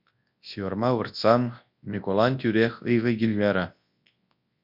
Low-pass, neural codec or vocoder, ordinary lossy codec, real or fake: 5.4 kHz; codec, 24 kHz, 0.9 kbps, WavTokenizer, large speech release; MP3, 48 kbps; fake